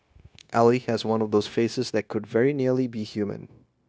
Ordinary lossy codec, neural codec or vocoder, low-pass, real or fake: none; codec, 16 kHz, 0.9 kbps, LongCat-Audio-Codec; none; fake